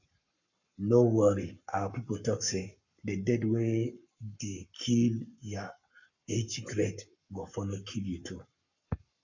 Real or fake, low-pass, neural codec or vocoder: fake; 7.2 kHz; codec, 44.1 kHz, 7.8 kbps, Pupu-Codec